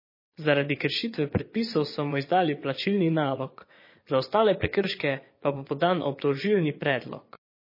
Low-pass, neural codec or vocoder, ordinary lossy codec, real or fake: 5.4 kHz; vocoder, 22.05 kHz, 80 mel bands, Vocos; MP3, 24 kbps; fake